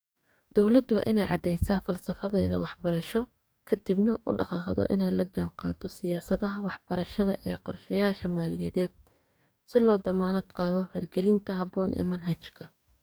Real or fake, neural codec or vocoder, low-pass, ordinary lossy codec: fake; codec, 44.1 kHz, 2.6 kbps, DAC; none; none